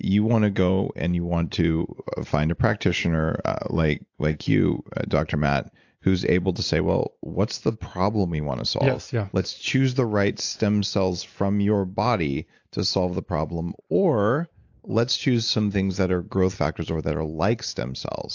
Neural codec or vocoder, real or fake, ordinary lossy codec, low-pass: none; real; AAC, 48 kbps; 7.2 kHz